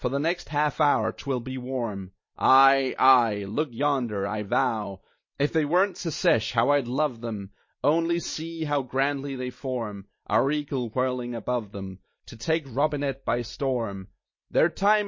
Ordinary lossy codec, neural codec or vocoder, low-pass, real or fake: MP3, 32 kbps; none; 7.2 kHz; real